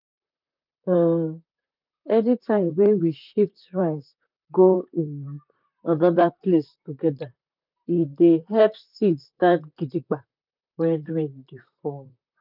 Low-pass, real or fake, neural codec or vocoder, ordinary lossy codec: 5.4 kHz; fake; vocoder, 44.1 kHz, 128 mel bands, Pupu-Vocoder; none